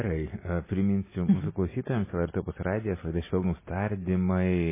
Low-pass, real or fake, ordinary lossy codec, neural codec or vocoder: 3.6 kHz; real; MP3, 16 kbps; none